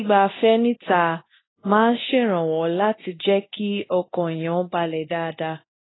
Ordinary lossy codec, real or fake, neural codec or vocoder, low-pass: AAC, 16 kbps; fake; codec, 24 kHz, 0.9 kbps, DualCodec; 7.2 kHz